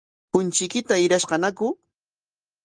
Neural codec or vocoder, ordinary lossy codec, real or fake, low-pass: none; Opus, 24 kbps; real; 9.9 kHz